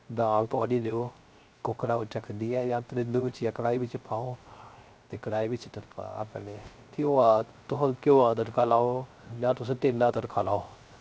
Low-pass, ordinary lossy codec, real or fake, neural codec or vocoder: none; none; fake; codec, 16 kHz, 0.3 kbps, FocalCodec